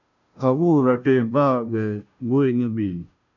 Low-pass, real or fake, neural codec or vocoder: 7.2 kHz; fake; codec, 16 kHz, 0.5 kbps, FunCodec, trained on Chinese and English, 25 frames a second